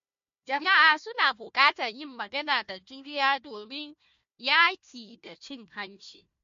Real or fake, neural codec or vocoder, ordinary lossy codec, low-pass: fake; codec, 16 kHz, 1 kbps, FunCodec, trained on Chinese and English, 50 frames a second; MP3, 48 kbps; 7.2 kHz